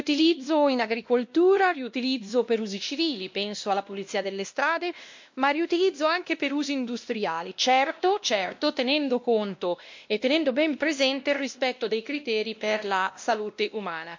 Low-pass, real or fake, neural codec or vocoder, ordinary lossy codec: 7.2 kHz; fake; codec, 16 kHz, 1 kbps, X-Codec, WavLM features, trained on Multilingual LibriSpeech; MP3, 48 kbps